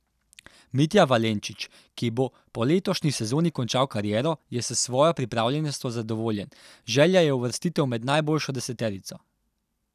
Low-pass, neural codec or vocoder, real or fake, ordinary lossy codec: 14.4 kHz; none; real; none